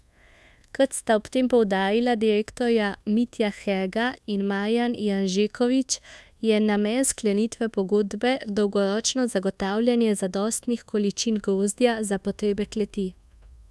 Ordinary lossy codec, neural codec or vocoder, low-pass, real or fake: none; codec, 24 kHz, 1.2 kbps, DualCodec; none; fake